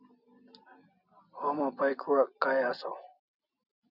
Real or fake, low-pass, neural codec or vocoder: real; 5.4 kHz; none